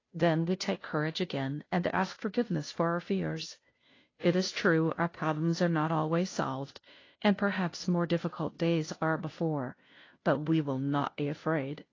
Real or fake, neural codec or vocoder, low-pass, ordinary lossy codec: fake; codec, 16 kHz, 0.5 kbps, FunCodec, trained on Chinese and English, 25 frames a second; 7.2 kHz; AAC, 32 kbps